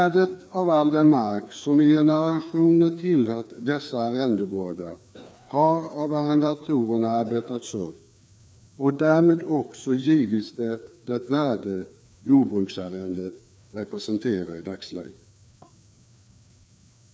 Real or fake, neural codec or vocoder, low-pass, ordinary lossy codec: fake; codec, 16 kHz, 2 kbps, FreqCodec, larger model; none; none